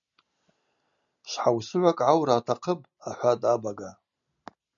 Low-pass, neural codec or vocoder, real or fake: 7.2 kHz; none; real